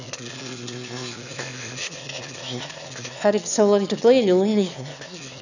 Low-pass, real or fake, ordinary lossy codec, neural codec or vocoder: 7.2 kHz; fake; none; autoencoder, 22.05 kHz, a latent of 192 numbers a frame, VITS, trained on one speaker